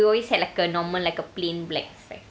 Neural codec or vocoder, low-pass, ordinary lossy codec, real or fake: none; none; none; real